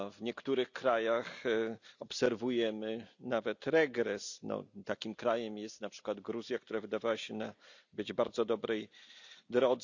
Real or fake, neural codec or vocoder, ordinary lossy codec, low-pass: real; none; none; 7.2 kHz